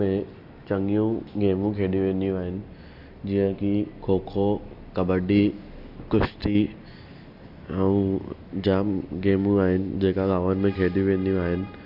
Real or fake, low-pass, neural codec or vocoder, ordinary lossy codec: real; 5.4 kHz; none; AAC, 48 kbps